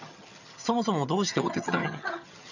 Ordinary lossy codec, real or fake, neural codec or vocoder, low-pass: none; fake; vocoder, 22.05 kHz, 80 mel bands, HiFi-GAN; 7.2 kHz